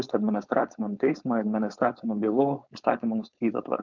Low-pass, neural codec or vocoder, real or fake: 7.2 kHz; codec, 16 kHz, 4.8 kbps, FACodec; fake